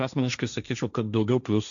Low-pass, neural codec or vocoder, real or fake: 7.2 kHz; codec, 16 kHz, 1.1 kbps, Voila-Tokenizer; fake